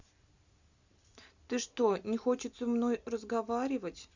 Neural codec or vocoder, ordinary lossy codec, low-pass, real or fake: none; Opus, 64 kbps; 7.2 kHz; real